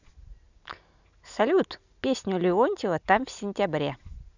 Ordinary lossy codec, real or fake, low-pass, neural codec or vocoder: none; real; 7.2 kHz; none